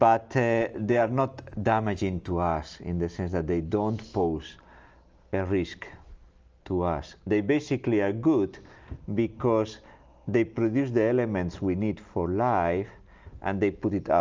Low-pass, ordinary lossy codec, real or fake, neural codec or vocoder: 7.2 kHz; Opus, 24 kbps; real; none